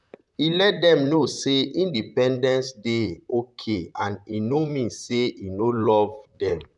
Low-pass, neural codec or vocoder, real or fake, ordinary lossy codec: 10.8 kHz; vocoder, 44.1 kHz, 128 mel bands, Pupu-Vocoder; fake; none